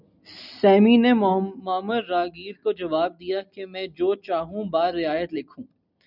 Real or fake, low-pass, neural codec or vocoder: real; 5.4 kHz; none